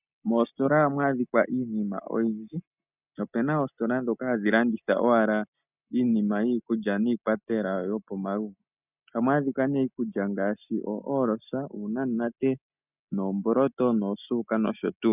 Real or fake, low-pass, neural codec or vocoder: real; 3.6 kHz; none